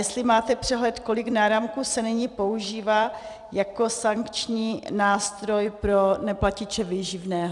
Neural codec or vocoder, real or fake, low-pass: vocoder, 44.1 kHz, 128 mel bands every 256 samples, BigVGAN v2; fake; 10.8 kHz